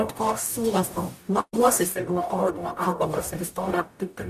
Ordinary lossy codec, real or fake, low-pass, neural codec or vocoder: AAC, 96 kbps; fake; 14.4 kHz; codec, 44.1 kHz, 0.9 kbps, DAC